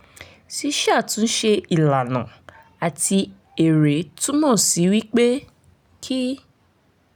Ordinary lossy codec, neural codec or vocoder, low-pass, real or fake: none; none; none; real